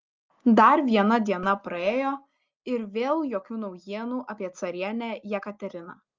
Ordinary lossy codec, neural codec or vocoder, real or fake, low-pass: Opus, 24 kbps; none; real; 7.2 kHz